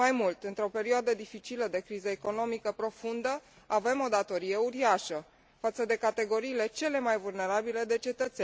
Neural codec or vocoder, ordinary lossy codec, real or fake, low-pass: none; none; real; none